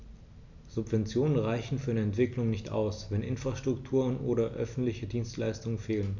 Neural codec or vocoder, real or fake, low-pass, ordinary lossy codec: none; real; 7.2 kHz; none